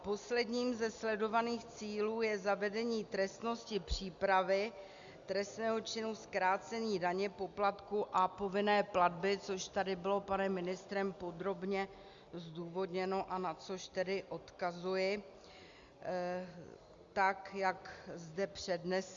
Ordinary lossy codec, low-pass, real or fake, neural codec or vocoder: Opus, 64 kbps; 7.2 kHz; real; none